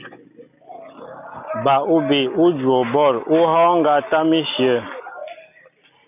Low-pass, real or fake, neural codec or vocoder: 3.6 kHz; real; none